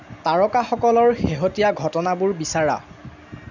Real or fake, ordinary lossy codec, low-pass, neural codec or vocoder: real; none; 7.2 kHz; none